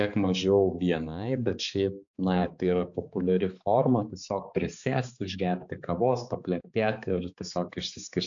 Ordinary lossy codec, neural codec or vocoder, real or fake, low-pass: AAC, 64 kbps; codec, 16 kHz, 4 kbps, X-Codec, HuBERT features, trained on balanced general audio; fake; 7.2 kHz